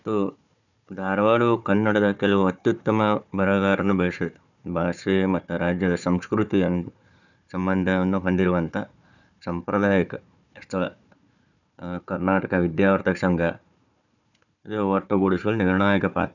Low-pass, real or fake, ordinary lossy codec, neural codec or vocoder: 7.2 kHz; fake; none; codec, 16 kHz, 4 kbps, FunCodec, trained on Chinese and English, 50 frames a second